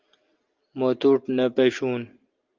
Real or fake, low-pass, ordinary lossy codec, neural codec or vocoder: real; 7.2 kHz; Opus, 32 kbps; none